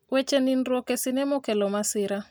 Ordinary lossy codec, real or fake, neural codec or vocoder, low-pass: none; real; none; none